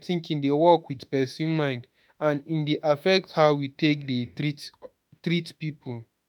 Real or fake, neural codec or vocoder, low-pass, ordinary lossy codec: fake; autoencoder, 48 kHz, 32 numbers a frame, DAC-VAE, trained on Japanese speech; none; none